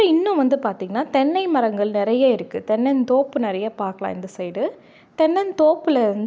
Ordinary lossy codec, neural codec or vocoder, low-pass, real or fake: none; none; none; real